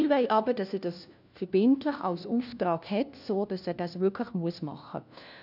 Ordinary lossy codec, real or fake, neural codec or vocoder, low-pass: none; fake; codec, 16 kHz, 1 kbps, FunCodec, trained on LibriTTS, 50 frames a second; 5.4 kHz